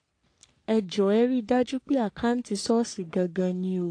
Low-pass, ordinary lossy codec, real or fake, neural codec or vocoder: 9.9 kHz; AAC, 48 kbps; fake; codec, 44.1 kHz, 3.4 kbps, Pupu-Codec